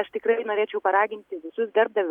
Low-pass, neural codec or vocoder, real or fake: 19.8 kHz; none; real